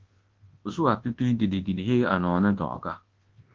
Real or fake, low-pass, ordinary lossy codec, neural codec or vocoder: fake; 7.2 kHz; Opus, 16 kbps; codec, 24 kHz, 0.9 kbps, WavTokenizer, large speech release